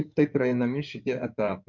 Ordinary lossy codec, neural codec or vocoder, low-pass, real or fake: MP3, 48 kbps; codec, 16 kHz in and 24 kHz out, 2.2 kbps, FireRedTTS-2 codec; 7.2 kHz; fake